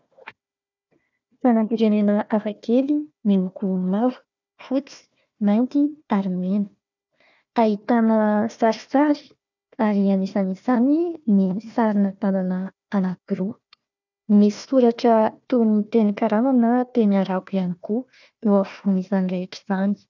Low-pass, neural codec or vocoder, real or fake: 7.2 kHz; codec, 16 kHz, 1 kbps, FunCodec, trained on Chinese and English, 50 frames a second; fake